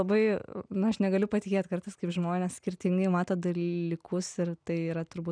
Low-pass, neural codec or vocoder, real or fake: 9.9 kHz; none; real